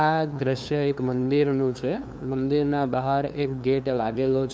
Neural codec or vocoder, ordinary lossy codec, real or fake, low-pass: codec, 16 kHz, 2 kbps, FunCodec, trained on LibriTTS, 25 frames a second; none; fake; none